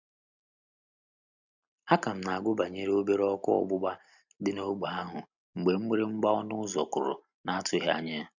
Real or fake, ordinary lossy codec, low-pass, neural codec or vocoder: real; none; 7.2 kHz; none